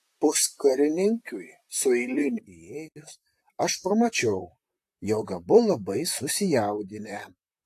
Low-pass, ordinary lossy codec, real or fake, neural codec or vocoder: 14.4 kHz; AAC, 64 kbps; fake; vocoder, 44.1 kHz, 128 mel bands, Pupu-Vocoder